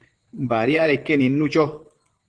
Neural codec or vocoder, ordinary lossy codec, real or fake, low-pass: vocoder, 22.05 kHz, 80 mel bands, Vocos; Opus, 16 kbps; fake; 9.9 kHz